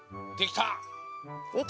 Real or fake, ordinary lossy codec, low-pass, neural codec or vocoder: real; none; none; none